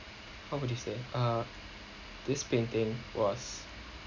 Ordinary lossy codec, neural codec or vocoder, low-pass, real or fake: none; none; 7.2 kHz; real